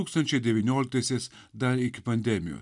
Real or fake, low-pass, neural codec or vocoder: real; 10.8 kHz; none